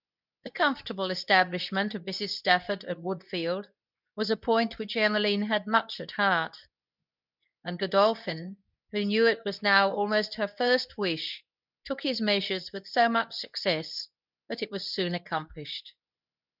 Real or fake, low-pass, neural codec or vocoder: fake; 5.4 kHz; codec, 24 kHz, 0.9 kbps, WavTokenizer, medium speech release version 2